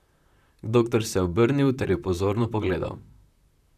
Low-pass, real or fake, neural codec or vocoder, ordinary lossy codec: 14.4 kHz; fake; vocoder, 44.1 kHz, 128 mel bands, Pupu-Vocoder; none